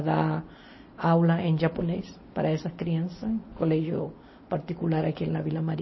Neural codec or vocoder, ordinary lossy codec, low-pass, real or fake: none; MP3, 24 kbps; 7.2 kHz; real